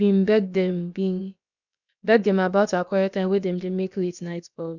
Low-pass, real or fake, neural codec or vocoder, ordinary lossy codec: 7.2 kHz; fake; codec, 16 kHz, about 1 kbps, DyCAST, with the encoder's durations; none